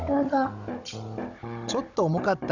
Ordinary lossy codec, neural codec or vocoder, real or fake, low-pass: none; codec, 16 kHz, 16 kbps, FunCodec, trained on Chinese and English, 50 frames a second; fake; 7.2 kHz